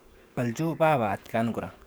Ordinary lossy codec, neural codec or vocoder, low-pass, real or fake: none; codec, 44.1 kHz, 7.8 kbps, DAC; none; fake